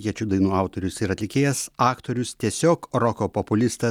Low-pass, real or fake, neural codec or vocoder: 19.8 kHz; real; none